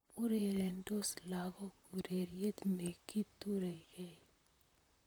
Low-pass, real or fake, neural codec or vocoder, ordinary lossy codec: none; fake; vocoder, 44.1 kHz, 128 mel bands, Pupu-Vocoder; none